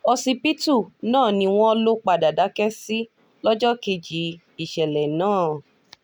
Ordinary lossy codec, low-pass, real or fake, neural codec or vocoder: none; none; real; none